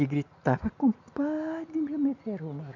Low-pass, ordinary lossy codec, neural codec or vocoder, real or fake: 7.2 kHz; none; none; real